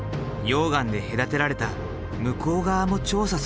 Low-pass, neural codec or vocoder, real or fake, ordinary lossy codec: none; none; real; none